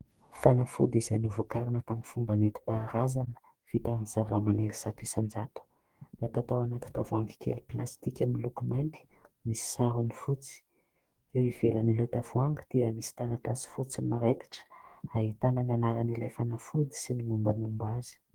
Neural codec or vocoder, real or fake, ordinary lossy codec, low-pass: codec, 44.1 kHz, 2.6 kbps, DAC; fake; Opus, 24 kbps; 19.8 kHz